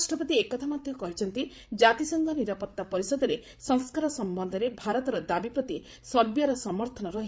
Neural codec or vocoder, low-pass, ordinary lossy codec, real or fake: codec, 16 kHz, 8 kbps, FreqCodec, larger model; none; none; fake